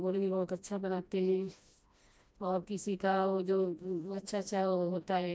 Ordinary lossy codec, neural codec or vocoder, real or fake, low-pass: none; codec, 16 kHz, 1 kbps, FreqCodec, smaller model; fake; none